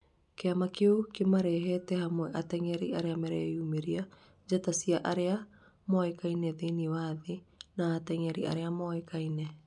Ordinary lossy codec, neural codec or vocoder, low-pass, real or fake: none; none; 10.8 kHz; real